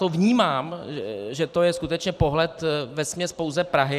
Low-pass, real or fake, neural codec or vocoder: 14.4 kHz; fake; vocoder, 44.1 kHz, 128 mel bands every 512 samples, BigVGAN v2